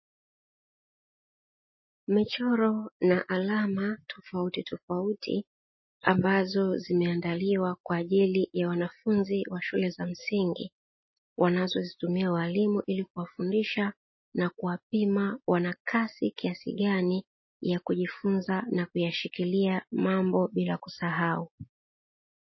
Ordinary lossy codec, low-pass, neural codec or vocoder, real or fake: MP3, 24 kbps; 7.2 kHz; none; real